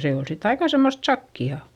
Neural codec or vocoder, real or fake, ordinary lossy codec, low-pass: none; real; Opus, 64 kbps; 19.8 kHz